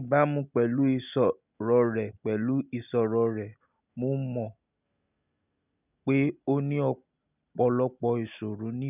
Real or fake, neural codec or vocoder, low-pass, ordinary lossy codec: real; none; 3.6 kHz; none